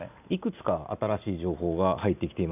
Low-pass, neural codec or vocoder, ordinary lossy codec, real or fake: 3.6 kHz; none; none; real